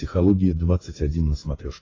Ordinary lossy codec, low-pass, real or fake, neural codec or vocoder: AAC, 32 kbps; 7.2 kHz; fake; codec, 16 kHz, 8 kbps, FreqCodec, smaller model